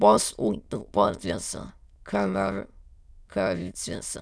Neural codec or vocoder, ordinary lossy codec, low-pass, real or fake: autoencoder, 22.05 kHz, a latent of 192 numbers a frame, VITS, trained on many speakers; none; none; fake